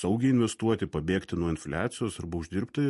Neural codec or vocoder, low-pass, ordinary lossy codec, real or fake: none; 14.4 kHz; MP3, 48 kbps; real